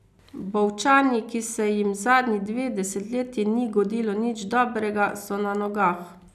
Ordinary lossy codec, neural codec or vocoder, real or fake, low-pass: none; none; real; 14.4 kHz